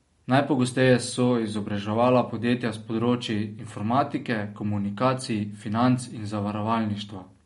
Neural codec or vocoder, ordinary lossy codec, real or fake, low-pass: none; MP3, 48 kbps; real; 19.8 kHz